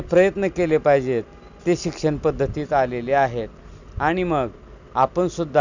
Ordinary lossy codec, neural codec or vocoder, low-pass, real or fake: none; none; 7.2 kHz; real